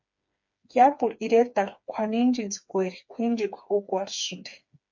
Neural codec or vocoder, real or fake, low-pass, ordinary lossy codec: codec, 16 kHz, 4 kbps, FreqCodec, smaller model; fake; 7.2 kHz; MP3, 48 kbps